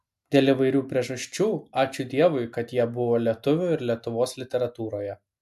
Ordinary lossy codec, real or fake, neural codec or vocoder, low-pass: AAC, 96 kbps; real; none; 14.4 kHz